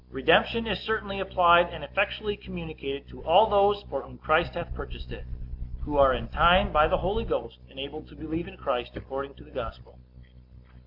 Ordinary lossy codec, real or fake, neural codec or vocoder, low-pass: MP3, 48 kbps; real; none; 5.4 kHz